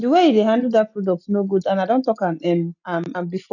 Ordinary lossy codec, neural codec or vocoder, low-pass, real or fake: none; none; 7.2 kHz; real